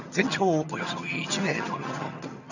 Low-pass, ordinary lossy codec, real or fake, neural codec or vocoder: 7.2 kHz; none; fake; vocoder, 22.05 kHz, 80 mel bands, HiFi-GAN